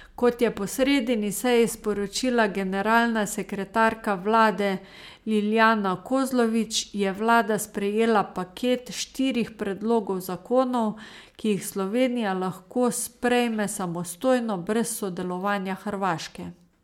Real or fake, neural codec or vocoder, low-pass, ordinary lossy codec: real; none; 19.8 kHz; MP3, 96 kbps